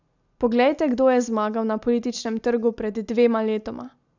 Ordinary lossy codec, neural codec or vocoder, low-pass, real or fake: none; none; 7.2 kHz; real